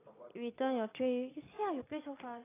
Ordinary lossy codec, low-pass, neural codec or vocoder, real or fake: AAC, 16 kbps; 3.6 kHz; vocoder, 22.05 kHz, 80 mel bands, Vocos; fake